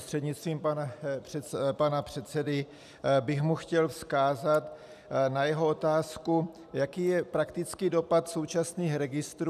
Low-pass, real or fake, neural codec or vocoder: 14.4 kHz; real; none